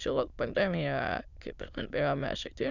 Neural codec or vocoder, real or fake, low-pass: autoencoder, 22.05 kHz, a latent of 192 numbers a frame, VITS, trained on many speakers; fake; 7.2 kHz